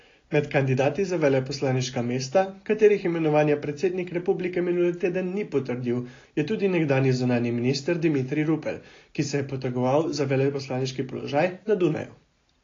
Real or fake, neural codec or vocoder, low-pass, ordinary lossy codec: real; none; 7.2 kHz; AAC, 32 kbps